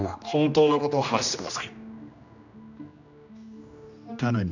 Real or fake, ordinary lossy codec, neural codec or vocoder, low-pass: fake; none; codec, 16 kHz, 1 kbps, X-Codec, HuBERT features, trained on general audio; 7.2 kHz